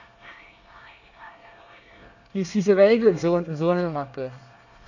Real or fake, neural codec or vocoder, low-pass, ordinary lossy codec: fake; codec, 24 kHz, 1 kbps, SNAC; 7.2 kHz; none